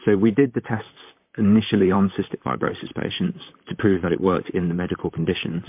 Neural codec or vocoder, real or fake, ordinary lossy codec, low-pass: vocoder, 44.1 kHz, 128 mel bands, Pupu-Vocoder; fake; MP3, 32 kbps; 3.6 kHz